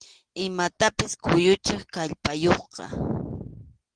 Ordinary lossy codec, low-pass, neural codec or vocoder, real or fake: Opus, 16 kbps; 9.9 kHz; none; real